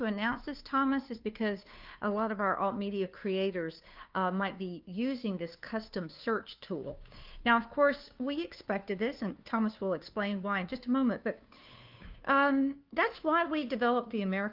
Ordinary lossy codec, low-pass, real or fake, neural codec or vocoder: Opus, 32 kbps; 5.4 kHz; fake; codec, 16 kHz, 2 kbps, FunCodec, trained on Chinese and English, 25 frames a second